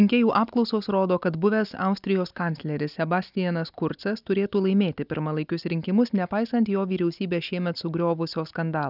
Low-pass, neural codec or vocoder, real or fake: 5.4 kHz; none; real